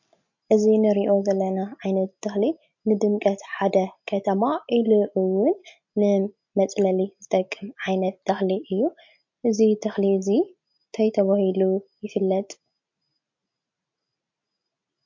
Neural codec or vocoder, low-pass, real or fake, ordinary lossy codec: none; 7.2 kHz; real; MP3, 48 kbps